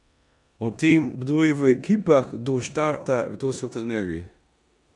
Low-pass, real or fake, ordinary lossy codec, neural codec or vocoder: 10.8 kHz; fake; none; codec, 16 kHz in and 24 kHz out, 0.9 kbps, LongCat-Audio-Codec, four codebook decoder